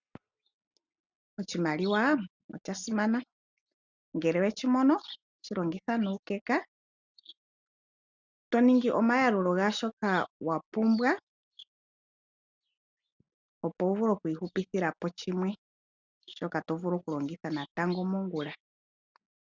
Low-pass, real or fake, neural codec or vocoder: 7.2 kHz; real; none